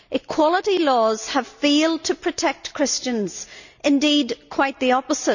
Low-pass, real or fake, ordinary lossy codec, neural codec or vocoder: 7.2 kHz; real; none; none